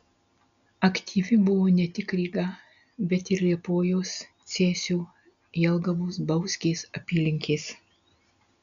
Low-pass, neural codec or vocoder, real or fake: 7.2 kHz; none; real